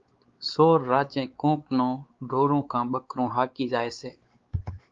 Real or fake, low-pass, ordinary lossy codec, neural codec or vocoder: fake; 7.2 kHz; Opus, 32 kbps; codec, 16 kHz, 4 kbps, X-Codec, WavLM features, trained on Multilingual LibriSpeech